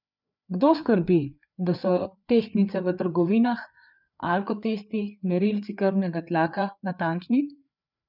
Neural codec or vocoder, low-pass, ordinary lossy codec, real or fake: codec, 16 kHz, 4 kbps, FreqCodec, larger model; 5.4 kHz; none; fake